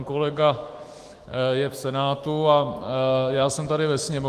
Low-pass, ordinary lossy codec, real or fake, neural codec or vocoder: 14.4 kHz; Opus, 24 kbps; fake; autoencoder, 48 kHz, 128 numbers a frame, DAC-VAE, trained on Japanese speech